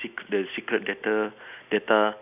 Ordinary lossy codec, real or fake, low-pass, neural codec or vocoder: none; real; 3.6 kHz; none